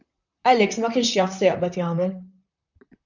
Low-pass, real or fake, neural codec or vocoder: 7.2 kHz; fake; vocoder, 44.1 kHz, 128 mel bands, Pupu-Vocoder